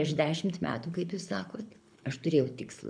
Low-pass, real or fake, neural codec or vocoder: 9.9 kHz; fake; codec, 24 kHz, 6 kbps, HILCodec